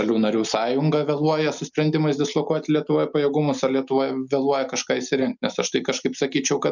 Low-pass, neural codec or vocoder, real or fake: 7.2 kHz; none; real